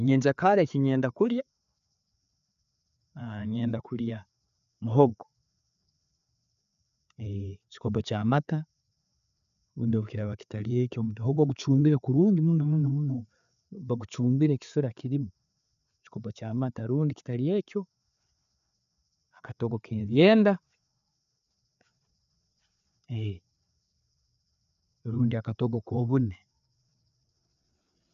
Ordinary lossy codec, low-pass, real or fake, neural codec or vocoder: none; 7.2 kHz; fake; codec, 16 kHz, 4 kbps, FreqCodec, larger model